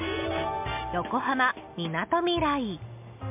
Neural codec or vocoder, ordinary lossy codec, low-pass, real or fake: none; MP3, 32 kbps; 3.6 kHz; real